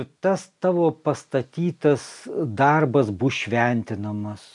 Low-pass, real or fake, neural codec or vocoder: 10.8 kHz; real; none